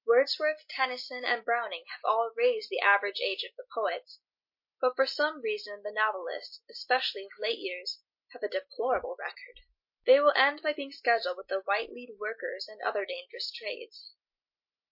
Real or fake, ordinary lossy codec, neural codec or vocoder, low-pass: real; MP3, 32 kbps; none; 5.4 kHz